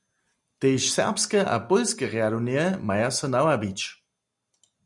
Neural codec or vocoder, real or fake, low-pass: none; real; 10.8 kHz